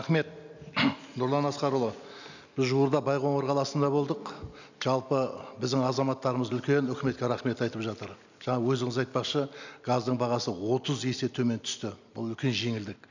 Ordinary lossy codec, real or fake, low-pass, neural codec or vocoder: none; real; 7.2 kHz; none